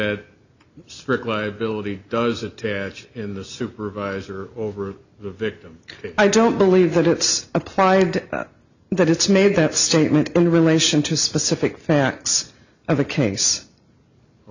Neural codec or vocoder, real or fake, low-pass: none; real; 7.2 kHz